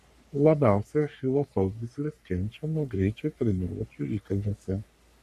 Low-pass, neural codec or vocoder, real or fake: 14.4 kHz; codec, 44.1 kHz, 3.4 kbps, Pupu-Codec; fake